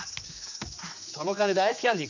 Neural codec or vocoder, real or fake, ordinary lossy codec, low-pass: codec, 16 kHz, 2 kbps, X-Codec, HuBERT features, trained on general audio; fake; none; 7.2 kHz